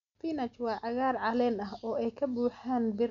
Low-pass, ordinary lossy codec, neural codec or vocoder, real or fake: 7.2 kHz; none; none; real